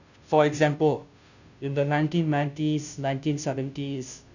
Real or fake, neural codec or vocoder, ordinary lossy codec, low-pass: fake; codec, 16 kHz, 0.5 kbps, FunCodec, trained on Chinese and English, 25 frames a second; none; 7.2 kHz